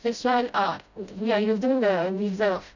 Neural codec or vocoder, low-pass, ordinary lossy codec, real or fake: codec, 16 kHz, 0.5 kbps, FreqCodec, smaller model; 7.2 kHz; none; fake